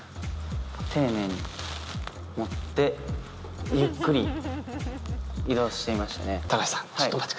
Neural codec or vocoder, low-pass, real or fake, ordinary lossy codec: none; none; real; none